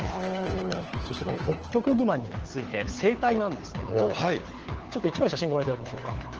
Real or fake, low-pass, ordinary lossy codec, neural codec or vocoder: fake; 7.2 kHz; Opus, 16 kbps; codec, 16 kHz, 4 kbps, FunCodec, trained on LibriTTS, 50 frames a second